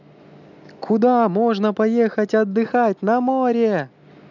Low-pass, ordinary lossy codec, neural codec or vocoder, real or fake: 7.2 kHz; none; none; real